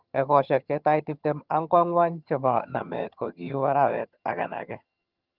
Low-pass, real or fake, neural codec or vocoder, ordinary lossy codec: 5.4 kHz; fake; vocoder, 22.05 kHz, 80 mel bands, HiFi-GAN; Opus, 24 kbps